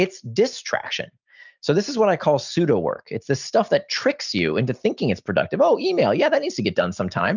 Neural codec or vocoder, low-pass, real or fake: none; 7.2 kHz; real